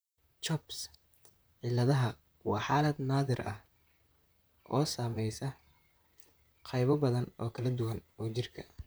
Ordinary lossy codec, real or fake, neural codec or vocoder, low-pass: none; fake; vocoder, 44.1 kHz, 128 mel bands, Pupu-Vocoder; none